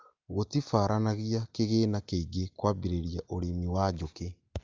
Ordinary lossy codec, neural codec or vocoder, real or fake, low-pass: Opus, 24 kbps; none; real; 7.2 kHz